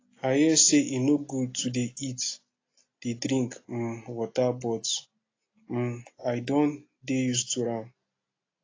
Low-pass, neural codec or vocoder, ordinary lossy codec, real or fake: 7.2 kHz; none; AAC, 32 kbps; real